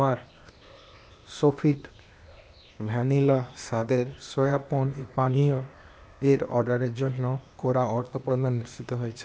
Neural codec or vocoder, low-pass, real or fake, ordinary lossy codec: codec, 16 kHz, 0.8 kbps, ZipCodec; none; fake; none